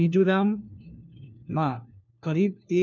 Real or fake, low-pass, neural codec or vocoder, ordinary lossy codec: fake; 7.2 kHz; codec, 16 kHz, 1 kbps, FunCodec, trained on LibriTTS, 50 frames a second; none